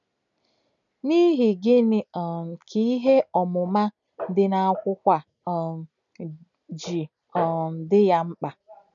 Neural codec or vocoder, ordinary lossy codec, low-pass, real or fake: none; none; 7.2 kHz; real